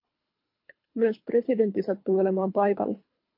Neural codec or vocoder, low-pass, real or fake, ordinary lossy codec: codec, 24 kHz, 3 kbps, HILCodec; 5.4 kHz; fake; MP3, 32 kbps